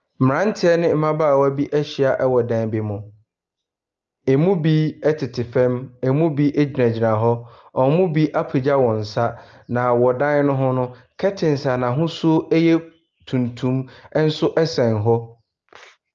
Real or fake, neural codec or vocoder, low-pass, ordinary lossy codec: real; none; 7.2 kHz; Opus, 24 kbps